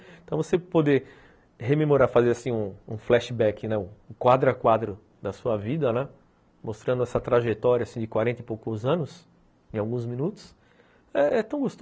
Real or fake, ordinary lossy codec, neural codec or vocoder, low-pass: real; none; none; none